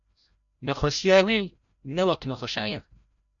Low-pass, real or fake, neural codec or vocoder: 7.2 kHz; fake; codec, 16 kHz, 0.5 kbps, FreqCodec, larger model